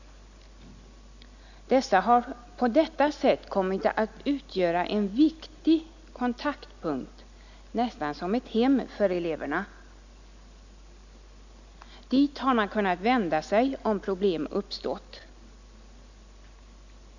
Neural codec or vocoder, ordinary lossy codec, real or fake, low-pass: none; none; real; 7.2 kHz